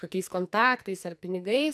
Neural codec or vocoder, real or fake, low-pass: codec, 44.1 kHz, 2.6 kbps, SNAC; fake; 14.4 kHz